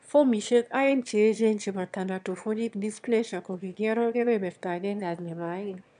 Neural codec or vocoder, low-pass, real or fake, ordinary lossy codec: autoencoder, 22.05 kHz, a latent of 192 numbers a frame, VITS, trained on one speaker; 9.9 kHz; fake; none